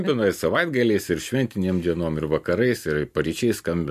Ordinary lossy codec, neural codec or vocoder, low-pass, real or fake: MP3, 64 kbps; none; 14.4 kHz; real